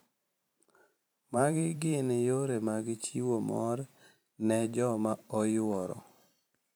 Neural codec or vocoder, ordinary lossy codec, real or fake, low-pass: vocoder, 44.1 kHz, 128 mel bands every 512 samples, BigVGAN v2; none; fake; none